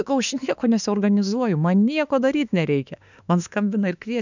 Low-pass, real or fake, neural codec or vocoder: 7.2 kHz; fake; autoencoder, 48 kHz, 32 numbers a frame, DAC-VAE, trained on Japanese speech